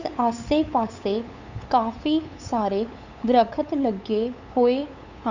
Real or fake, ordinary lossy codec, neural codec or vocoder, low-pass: fake; Opus, 64 kbps; codec, 16 kHz, 8 kbps, FunCodec, trained on LibriTTS, 25 frames a second; 7.2 kHz